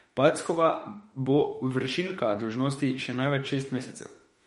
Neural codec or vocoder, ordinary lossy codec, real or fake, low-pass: autoencoder, 48 kHz, 32 numbers a frame, DAC-VAE, trained on Japanese speech; MP3, 48 kbps; fake; 19.8 kHz